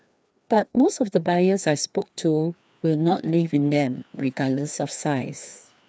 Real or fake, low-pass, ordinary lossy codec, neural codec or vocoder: fake; none; none; codec, 16 kHz, 2 kbps, FreqCodec, larger model